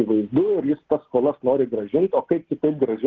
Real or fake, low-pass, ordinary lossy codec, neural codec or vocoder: real; 7.2 kHz; Opus, 16 kbps; none